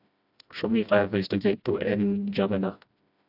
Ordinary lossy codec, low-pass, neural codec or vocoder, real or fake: AAC, 48 kbps; 5.4 kHz; codec, 16 kHz, 1 kbps, FreqCodec, smaller model; fake